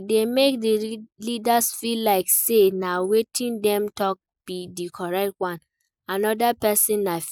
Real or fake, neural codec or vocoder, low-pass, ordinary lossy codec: real; none; none; none